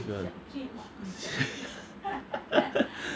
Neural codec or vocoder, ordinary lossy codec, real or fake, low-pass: none; none; real; none